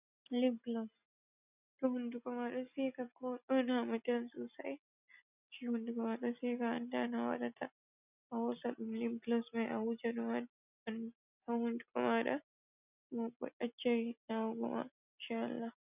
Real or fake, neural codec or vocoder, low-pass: real; none; 3.6 kHz